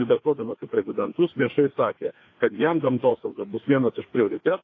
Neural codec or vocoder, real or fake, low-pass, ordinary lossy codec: codec, 16 kHz, 2 kbps, FreqCodec, larger model; fake; 7.2 kHz; AAC, 32 kbps